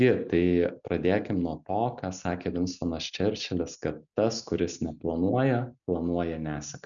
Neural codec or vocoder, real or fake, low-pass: none; real; 7.2 kHz